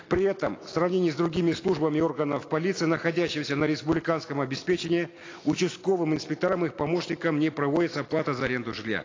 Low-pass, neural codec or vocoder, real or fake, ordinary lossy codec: 7.2 kHz; none; real; AAC, 32 kbps